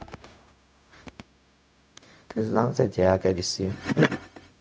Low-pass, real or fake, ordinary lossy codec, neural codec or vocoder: none; fake; none; codec, 16 kHz, 0.4 kbps, LongCat-Audio-Codec